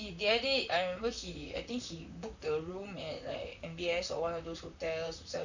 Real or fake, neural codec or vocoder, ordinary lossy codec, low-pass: fake; vocoder, 44.1 kHz, 128 mel bands, Pupu-Vocoder; none; 7.2 kHz